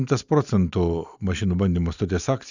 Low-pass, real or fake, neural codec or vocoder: 7.2 kHz; real; none